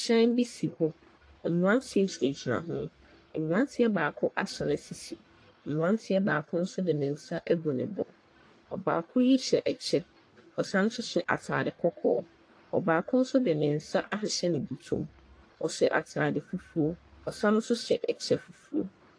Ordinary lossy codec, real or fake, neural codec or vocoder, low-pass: AAC, 48 kbps; fake; codec, 44.1 kHz, 1.7 kbps, Pupu-Codec; 9.9 kHz